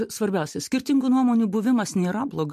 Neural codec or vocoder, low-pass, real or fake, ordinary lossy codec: none; 14.4 kHz; real; MP3, 64 kbps